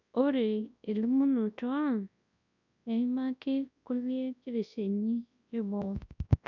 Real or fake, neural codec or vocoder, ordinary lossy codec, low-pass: fake; codec, 24 kHz, 0.9 kbps, WavTokenizer, large speech release; none; 7.2 kHz